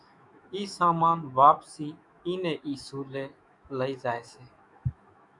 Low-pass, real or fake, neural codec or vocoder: 10.8 kHz; fake; autoencoder, 48 kHz, 128 numbers a frame, DAC-VAE, trained on Japanese speech